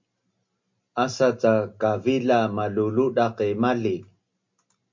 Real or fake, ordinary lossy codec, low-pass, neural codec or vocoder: real; MP3, 48 kbps; 7.2 kHz; none